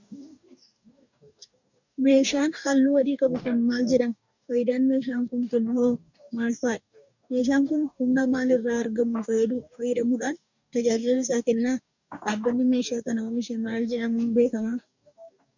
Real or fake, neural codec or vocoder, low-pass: fake; codec, 44.1 kHz, 2.6 kbps, DAC; 7.2 kHz